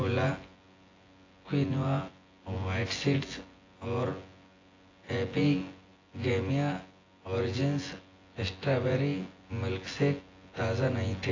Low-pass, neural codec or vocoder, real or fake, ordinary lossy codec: 7.2 kHz; vocoder, 24 kHz, 100 mel bands, Vocos; fake; AAC, 32 kbps